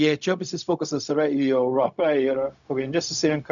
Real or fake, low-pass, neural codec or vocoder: fake; 7.2 kHz; codec, 16 kHz, 0.4 kbps, LongCat-Audio-Codec